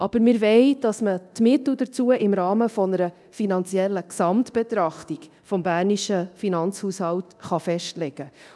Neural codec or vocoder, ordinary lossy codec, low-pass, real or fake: codec, 24 kHz, 0.9 kbps, DualCodec; none; none; fake